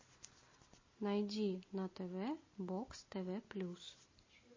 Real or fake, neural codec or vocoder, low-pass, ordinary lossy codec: real; none; 7.2 kHz; MP3, 32 kbps